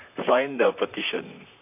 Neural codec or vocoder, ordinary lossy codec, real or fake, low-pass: vocoder, 44.1 kHz, 128 mel bands, Pupu-Vocoder; none; fake; 3.6 kHz